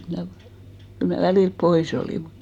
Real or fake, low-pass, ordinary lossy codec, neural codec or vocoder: real; 19.8 kHz; none; none